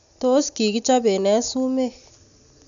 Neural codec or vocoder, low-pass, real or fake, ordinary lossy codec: none; 7.2 kHz; real; none